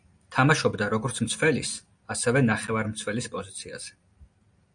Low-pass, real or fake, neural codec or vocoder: 9.9 kHz; real; none